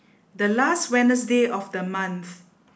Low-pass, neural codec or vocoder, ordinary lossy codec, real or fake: none; none; none; real